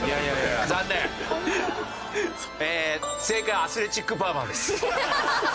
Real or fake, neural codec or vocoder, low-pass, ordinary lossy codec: real; none; none; none